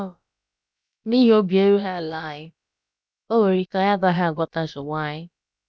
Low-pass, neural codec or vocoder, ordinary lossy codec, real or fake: none; codec, 16 kHz, about 1 kbps, DyCAST, with the encoder's durations; none; fake